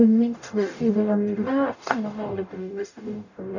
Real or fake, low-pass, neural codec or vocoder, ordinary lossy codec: fake; 7.2 kHz; codec, 44.1 kHz, 0.9 kbps, DAC; none